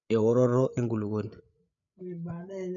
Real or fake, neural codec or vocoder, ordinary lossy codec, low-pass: fake; codec, 16 kHz, 8 kbps, FreqCodec, larger model; none; 7.2 kHz